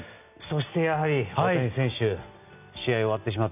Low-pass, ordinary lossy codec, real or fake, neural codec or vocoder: 3.6 kHz; none; real; none